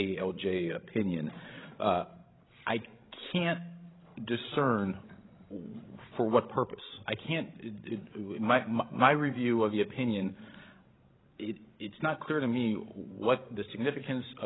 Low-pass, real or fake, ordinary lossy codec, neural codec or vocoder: 7.2 kHz; fake; AAC, 16 kbps; codec, 16 kHz, 16 kbps, FreqCodec, larger model